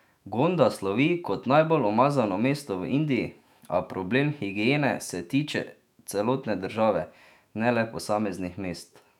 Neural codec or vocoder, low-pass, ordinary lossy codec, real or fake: autoencoder, 48 kHz, 128 numbers a frame, DAC-VAE, trained on Japanese speech; 19.8 kHz; none; fake